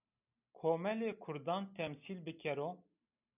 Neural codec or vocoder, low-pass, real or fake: none; 3.6 kHz; real